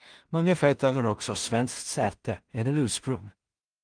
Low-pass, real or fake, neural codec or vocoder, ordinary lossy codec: 9.9 kHz; fake; codec, 16 kHz in and 24 kHz out, 0.4 kbps, LongCat-Audio-Codec, two codebook decoder; Opus, 32 kbps